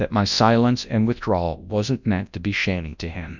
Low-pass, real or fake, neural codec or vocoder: 7.2 kHz; fake; codec, 24 kHz, 0.9 kbps, WavTokenizer, large speech release